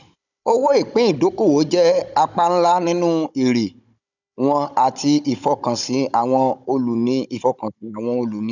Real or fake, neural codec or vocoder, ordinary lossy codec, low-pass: fake; codec, 16 kHz, 16 kbps, FunCodec, trained on Chinese and English, 50 frames a second; none; 7.2 kHz